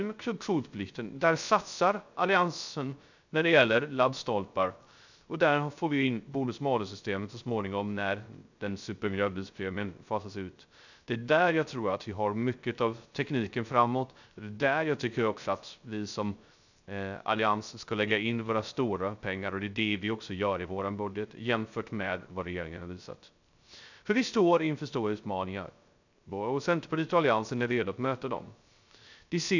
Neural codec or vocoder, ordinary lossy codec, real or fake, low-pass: codec, 16 kHz, 0.3 kbps, FocalCodec; none; fake; 7.2 kHz